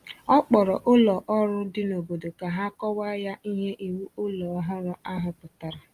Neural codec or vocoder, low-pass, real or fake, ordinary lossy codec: none; 14.4 kHz; real; Opus, 32 kbps